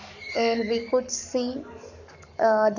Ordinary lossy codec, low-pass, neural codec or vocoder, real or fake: none; 7.2 kHz; codec, 44.1 kHz, 7.8 kbps, Pupu-Codec; fake